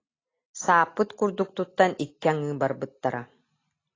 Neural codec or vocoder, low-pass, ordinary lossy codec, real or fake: none; 7.2 kHz; AAC, 32 kbps; real